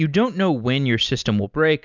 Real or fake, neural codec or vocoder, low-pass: real; none; 7.2 kHz